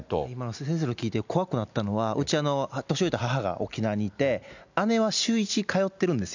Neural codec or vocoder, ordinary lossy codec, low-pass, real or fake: none; none; 7.2 kHz; real